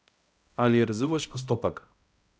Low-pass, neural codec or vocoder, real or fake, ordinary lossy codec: none; codec, 16 kHz, 0.5 kbps, X-Codec, HuBERT features, trained on balanced general audio; fake; none